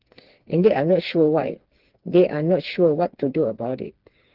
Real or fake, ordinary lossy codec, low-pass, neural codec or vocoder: fake; Opus, 16 kbps; 5.4 kHz; codec, 16 kHz, 4 kbps, FreqCodec, smaller model